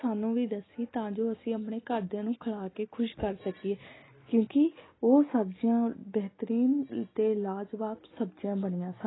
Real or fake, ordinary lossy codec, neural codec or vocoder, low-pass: real; AAC, 16 kbps; none; 7.2 kHz